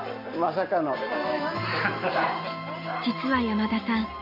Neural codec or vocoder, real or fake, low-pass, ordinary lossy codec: none; real; 5.4 kHz; none